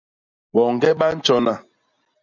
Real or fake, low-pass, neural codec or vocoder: real; 7.2 kHz; none